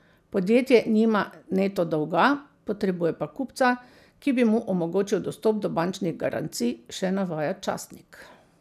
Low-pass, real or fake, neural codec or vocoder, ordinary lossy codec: 14.4 kHz; real; none; none